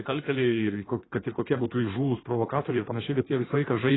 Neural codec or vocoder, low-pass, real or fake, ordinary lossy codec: codec, 16 kHz in and 24 kHz out, 1.1 kbps, FireRedTTS-2 codec; 7.2 kHz; fake; AAC, 16 kbps